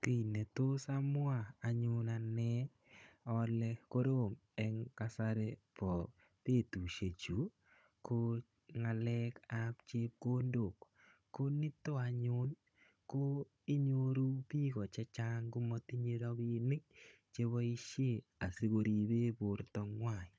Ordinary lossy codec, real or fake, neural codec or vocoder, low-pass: none; fake; codec, 16 kHz, 16 kbps, FunCodec, trained on Chinese and English, 50 frames a second; none